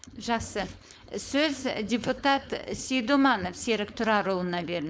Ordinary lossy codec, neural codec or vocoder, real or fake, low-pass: none; codec, 16 kHz, 4.8 kbps, FACodec; fake; none